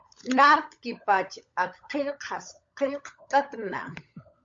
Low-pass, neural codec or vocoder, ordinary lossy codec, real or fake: 7.2 kHz; codec, 16 kHz, 16 kbps, FunCodec, trained on LibriTTS, 50 frames a second; MP3, 48 kbps; fake